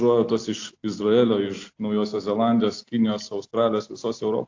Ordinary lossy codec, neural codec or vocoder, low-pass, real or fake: AAC, 48 kbps; none; 7.2 kHz; real